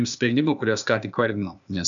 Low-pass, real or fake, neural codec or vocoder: 7.2 kHz; fake; codec, 16 kHz, 0.8 kbps, ZipCodec